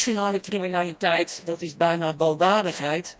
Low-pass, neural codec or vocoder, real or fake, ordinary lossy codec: none; codec, 16 kHz, 1 kbps, FreqCodec, smaller model; fake; none